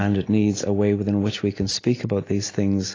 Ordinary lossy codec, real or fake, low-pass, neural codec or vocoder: AAC, 32 kbps; real; 7.2 kHz; none